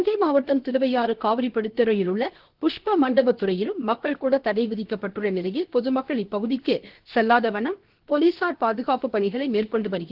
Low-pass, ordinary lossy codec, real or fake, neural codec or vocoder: 5.4 kHz; Opus, 16 kbps; fake; codec, 16 kHz, 0.7 kbps, FocalCodec